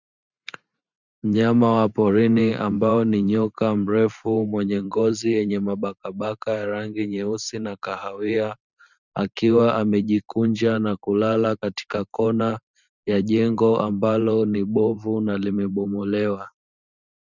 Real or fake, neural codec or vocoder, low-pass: fake; vocoder, 44.1 kHz, 128 mel bands every 512 samples, BigVGAN v2; 7.2 kHz